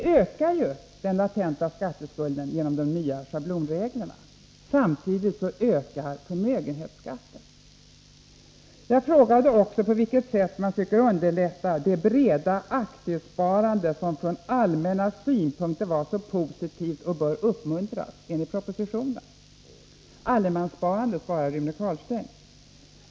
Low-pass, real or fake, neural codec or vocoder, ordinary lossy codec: none; real; none; none